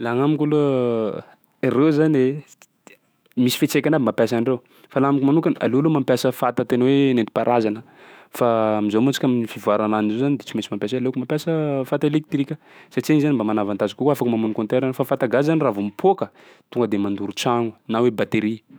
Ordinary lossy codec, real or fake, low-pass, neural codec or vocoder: none; fake; none; autoencoder, 48 kHz, 128 numbers a frame, DAC-VAE, trained on Japanese speech